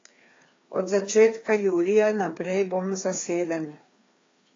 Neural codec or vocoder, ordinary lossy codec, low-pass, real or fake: codec, 16 kHz, 2 kbps, FreqCodec, larger model; AAC, 32 kbps; 7.2 kHz; fake